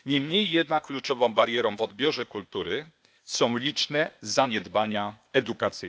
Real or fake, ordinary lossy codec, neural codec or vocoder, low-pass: fake; none; codec, 16 kHz, 0.8 kbps, ZipCodec; none